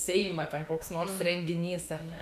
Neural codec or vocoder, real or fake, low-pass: autoencoder, 48 kHz, 32 numbers a frame, DAC-VAE, trained on Japanese speech; fake; 14.4 kHz